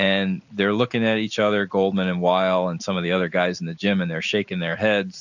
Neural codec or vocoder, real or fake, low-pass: none; real; 7.2 kHz